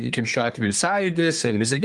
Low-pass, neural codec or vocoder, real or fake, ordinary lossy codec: 10.8 kHz; codec, 24 kHz, 1 kbps, SNAC; fake; Opus, 32 kbps